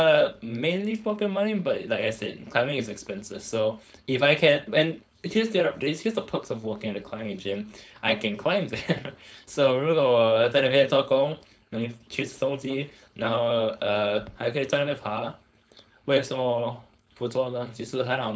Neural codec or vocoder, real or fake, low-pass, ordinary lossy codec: codec, 16 kHz, 4.8 kbps, FACodec; fake; none; none